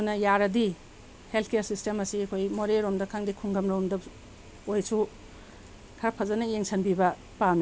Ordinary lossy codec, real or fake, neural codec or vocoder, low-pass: none; real; none; none